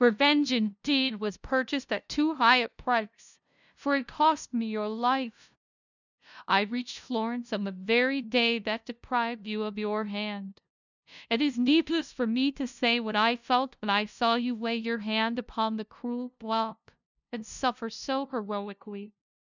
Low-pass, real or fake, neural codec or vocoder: 7.2 kHz; fake; codec, 16 kHz, 0.5 kbps, FunCodec, trained on LibriTTS, 25 frames a second